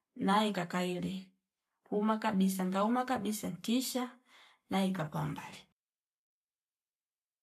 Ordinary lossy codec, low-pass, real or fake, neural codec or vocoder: none; 14.4 kHz; fake; codec, 44.1 kHz, 7.8 kbps, Pupu-Codec